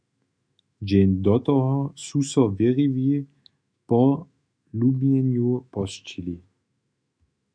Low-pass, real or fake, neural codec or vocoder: 9.9 kHz; fake; autoencoder, 48 kHz, 128 numbers a frame, DAC-VAE, trained on Japanese speech